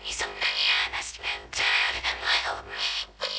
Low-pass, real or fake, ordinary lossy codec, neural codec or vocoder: none; fake; none; codec, 16 kHz, 0.3 kbps, FocalCodec